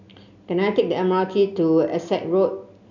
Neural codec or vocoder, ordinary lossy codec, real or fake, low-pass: none; none; real; 7.2 kHz